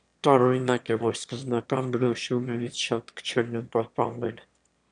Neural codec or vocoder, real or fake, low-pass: autoencoder, 22.05 kHz, a latent of 192 numbers a frame, VITS, trained on one speaker; fake; 9.9 kHz